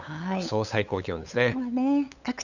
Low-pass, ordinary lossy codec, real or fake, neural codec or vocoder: 7.2 kHz; none; fake; codec, 16 kHz, 8 kbps, FunCodec, trained on LibriTTS, 25 frames a second